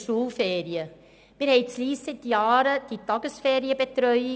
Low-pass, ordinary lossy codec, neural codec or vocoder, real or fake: none; none; none; real